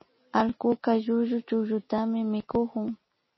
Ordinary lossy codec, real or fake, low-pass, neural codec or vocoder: MP3, 24 kbps; real; 7.2 kHz; none